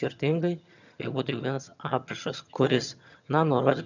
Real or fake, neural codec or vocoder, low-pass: fake; vocoder, 22.05 kHz, 80 mel bands, HiFi-GAN; 7.2 kHz